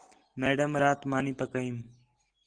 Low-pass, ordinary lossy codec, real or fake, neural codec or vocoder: 9.9 kHz; Opus, 16 kbps; real; none